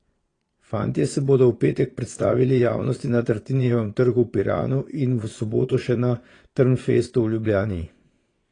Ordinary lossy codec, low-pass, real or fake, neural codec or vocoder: AAC, 32 kbps; 9.9 kHz; fake; vocoder, 22.05 kHz, 80 mel bands, Vocos